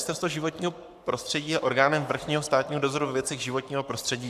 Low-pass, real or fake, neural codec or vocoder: 14.4 kHz; fake; codec, 44.1 kHz, 7.8 kbps, Pupu-Codec